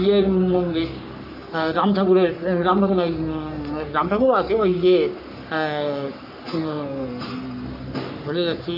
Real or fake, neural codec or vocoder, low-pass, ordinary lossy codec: fake; codec, 44.1 kHz, 3.4 kbps, Pupu-Codec; 5.4 kHz; AAC, 48 kbps